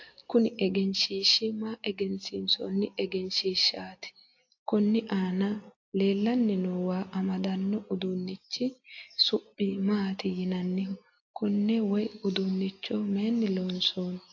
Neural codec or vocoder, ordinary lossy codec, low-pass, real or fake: none; AAC, 48 kbps; 7.2 kHz; real